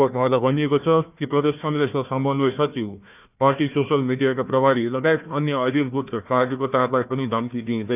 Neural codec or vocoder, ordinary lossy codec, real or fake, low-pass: codec, 16 kHz, 1 kbps, FunCodec, trained on Chinese and English, 50 frames a second; none; fake; 3.6 kHz